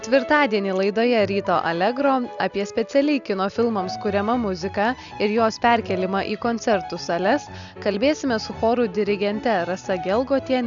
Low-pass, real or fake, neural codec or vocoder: 7.2 kHz; real; none